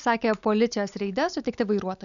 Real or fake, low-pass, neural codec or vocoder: real; 7.2 kHz; none